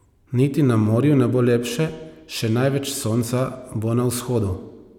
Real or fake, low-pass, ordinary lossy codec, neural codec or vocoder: real; 19.8 kHz; none; none